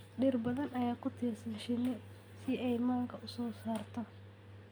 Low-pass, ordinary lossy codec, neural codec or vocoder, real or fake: none; none; none; real